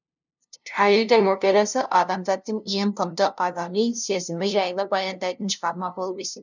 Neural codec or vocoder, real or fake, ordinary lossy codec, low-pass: codec, 16 kHz, 0.5 kbps, FunCodec, trained on LibriTTS, 25 frames a second; fake; none; 7.2 kHz